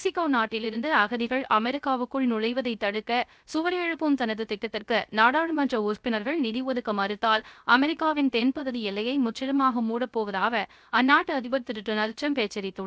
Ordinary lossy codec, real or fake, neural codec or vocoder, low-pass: none; fake; codec, 16 kHz, 0.3 kbps, FocalCodec; none